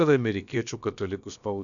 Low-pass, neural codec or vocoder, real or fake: 7.2 kHz; codec, 16 kHz, about 1 kbps, DyCAST, with the encoder's durations; fake